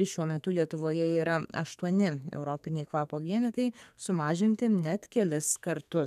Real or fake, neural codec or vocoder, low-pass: fake; codec, 32 kHz, 1.9 kbps, SNAC; 14.4 kHz